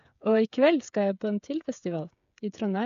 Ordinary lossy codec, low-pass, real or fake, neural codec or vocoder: none; 7.2 kHz; fake; codec, 16 kHz, 8 kbps, FreqCodec, smaller model